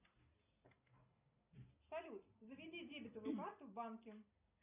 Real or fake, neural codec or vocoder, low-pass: real; none; 3.6 kHz